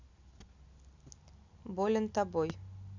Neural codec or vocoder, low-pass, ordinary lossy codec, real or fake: none; 7.2 kHz; MP3, 64 kbps; real